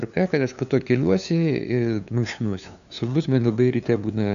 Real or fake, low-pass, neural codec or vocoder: fake; 7.2 kHz; codec, 16 kHz, 2 kbps, FunCodec, trained on LibriTTS, 25 frames a second